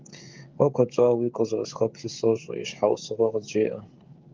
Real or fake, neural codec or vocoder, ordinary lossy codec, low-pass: fake; codec, 16 kHz, 16 kbps, FreqCodec, smaller model; Opus, 32 kbps; 7.2 kHz